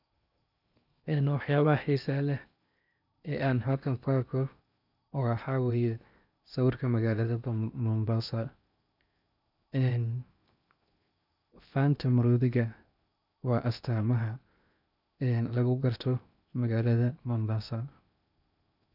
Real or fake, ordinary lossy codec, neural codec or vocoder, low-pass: fake; none; codec, 16 kHz in and 24 kHz out, 0.8 kbps, FocalCodec, streaming, 65536 codes; 5.4 kHz